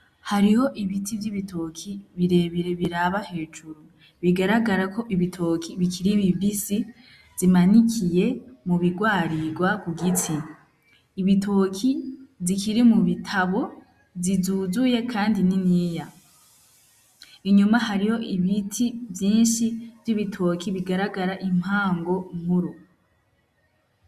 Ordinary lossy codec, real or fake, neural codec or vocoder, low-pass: Opus, 64 kbps; real; none; 14.4 kHz